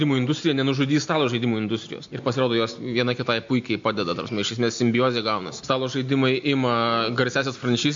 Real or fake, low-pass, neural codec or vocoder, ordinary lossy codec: real; 7.2 kHz; none; MP3, 48 kbps